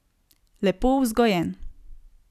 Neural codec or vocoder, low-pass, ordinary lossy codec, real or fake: none; 14.4 kHz; none; real